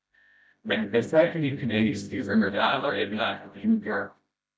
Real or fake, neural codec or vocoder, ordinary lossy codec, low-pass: fake; codec, 16 kHz, 0.5 kbps, FreqCodec, smaller model; none; none